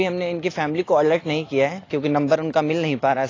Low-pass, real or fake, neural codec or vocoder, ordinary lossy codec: 7.2 kHz; real; none; AAC, 32 kbps